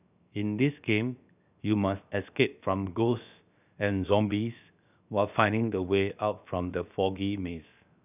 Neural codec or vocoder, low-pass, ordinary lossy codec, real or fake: codec, 16 kHz, 0.7 kbps, FocalCodec; 3.6 kHz; none; fake